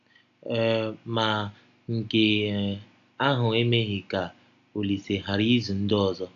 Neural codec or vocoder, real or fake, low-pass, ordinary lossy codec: none; real; 7.2 kHz; AAC, 96 kbps